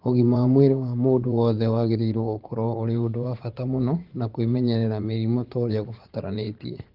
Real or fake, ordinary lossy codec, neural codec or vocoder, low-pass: fake; Opus, 16 kbps; vocoder, 44.1 kHz, 80 mel bands, Vocos; 5.4 kHz